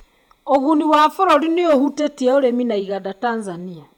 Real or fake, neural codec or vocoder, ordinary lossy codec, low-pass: fake; vocoder, 48 kHz, 128 mel bands, Vocos; none; 19.8 kHz